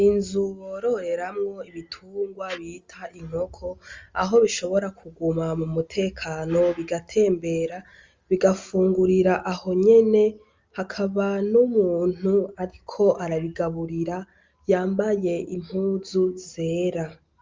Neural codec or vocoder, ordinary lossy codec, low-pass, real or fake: none; Opus, 32 kbps; 7.2 kHz; real